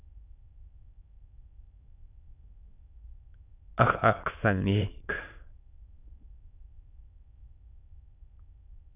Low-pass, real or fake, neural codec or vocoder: 3.6 kHz; fake; autoencoder, 22.05 kHz, a latent of 192 numbers a frame, VITS, trained on many speakers